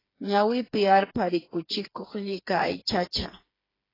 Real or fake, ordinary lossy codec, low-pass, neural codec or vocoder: fake; AAC, 24 kbps; 5.4 kHz; codec, 16 kHz, 4 kbps, FreqCodec, smaller model